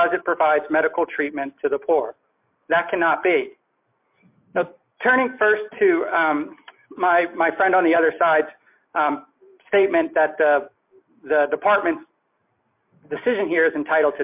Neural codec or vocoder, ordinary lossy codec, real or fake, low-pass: none; MP3, 32 kbps; real; 3.6 kHz